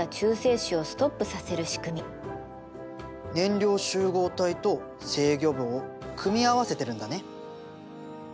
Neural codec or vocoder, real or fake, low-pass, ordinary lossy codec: none; real; none; none